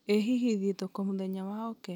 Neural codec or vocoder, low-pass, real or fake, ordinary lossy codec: none; 19.8 kHz; real; none